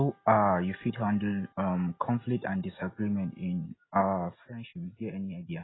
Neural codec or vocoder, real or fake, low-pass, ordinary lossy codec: none; real; 7.2 kHz; AAC, 16 kbps